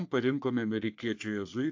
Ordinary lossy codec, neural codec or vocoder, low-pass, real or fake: AAC, 48 kbps; codec, 44.1 kHz, 3.4 kbps, Pupu-Codec; 7.2 kHz; fake